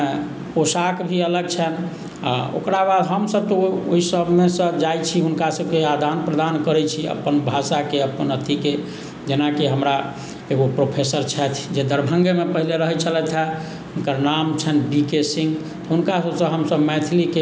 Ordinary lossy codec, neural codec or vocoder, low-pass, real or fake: none; none; none; real